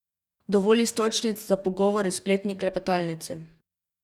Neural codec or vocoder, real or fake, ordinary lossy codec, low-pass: codec, 44.1 kHz, 2.6 kbps, DAC; fake; none; 19.8 kHz